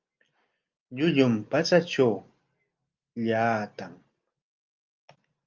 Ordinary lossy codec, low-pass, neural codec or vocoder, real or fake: Opus, 24 kbps; 7.2 kHz; none; real